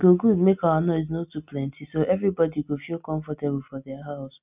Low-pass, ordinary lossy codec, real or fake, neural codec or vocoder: 3.6 kHz; none; real; none